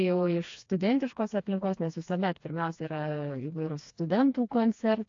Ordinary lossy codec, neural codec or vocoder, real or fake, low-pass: AAC, 48 kbps; codec, 16 kHz, 2 kbps, FreqCodec, smaller model; fake; 7.2 kHz